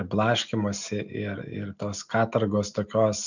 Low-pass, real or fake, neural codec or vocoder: 7.2 kHz; real; none